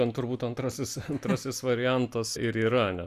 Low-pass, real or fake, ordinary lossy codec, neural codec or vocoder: 14.4 kHz; real; Opus, 64 kbps; none